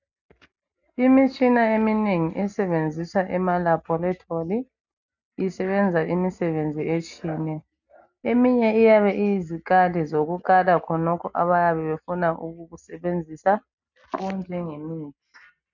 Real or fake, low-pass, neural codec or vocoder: real; 7.2 kHz; none